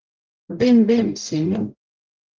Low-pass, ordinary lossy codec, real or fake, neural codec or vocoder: 7.2 kHz; Opus, 32 kbps; fake; codec, 44.1 kHz, 0.9 kbps, DAC